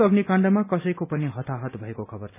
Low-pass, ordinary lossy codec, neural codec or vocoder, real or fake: 3.6 kHz; none; none; real